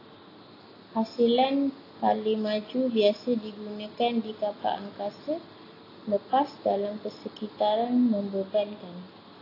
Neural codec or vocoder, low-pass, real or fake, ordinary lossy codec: none; 5.4 kHz; real; AAC, 24 kbps